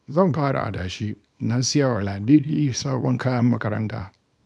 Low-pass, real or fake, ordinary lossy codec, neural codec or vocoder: none; fake; none; codec, 24 kHz, 0.9 kbps, WavTokenizer, small release